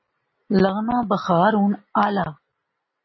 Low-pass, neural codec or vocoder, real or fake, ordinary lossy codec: 7.2 kHz; none; real; MP3, 24 kbps